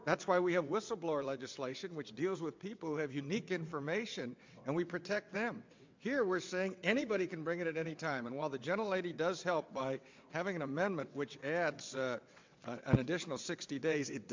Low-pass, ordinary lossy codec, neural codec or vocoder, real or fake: 7.2 kHz; MP3, 64 kbps; vocoder, 22.05 kHz, 80 mel bands, WaveNeXt; fake